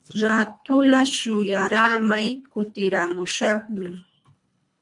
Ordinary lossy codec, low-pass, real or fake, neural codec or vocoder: MP3, 64 kbps; 10.8 kHz; fake; codec, 24 kHz, 1.5 kbps, HILCodec